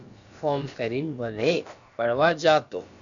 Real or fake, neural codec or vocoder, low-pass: fake; codec, 16 kHz, about 1 kbps, DyCAST, with the encoder's durations; 7.2 kHz